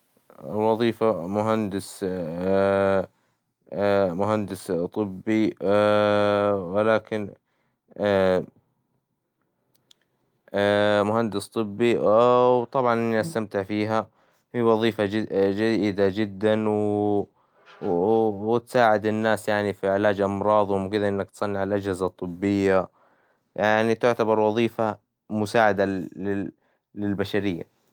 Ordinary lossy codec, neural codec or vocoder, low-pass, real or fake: Opus, 32 kbps; none; 19.8 kHz; real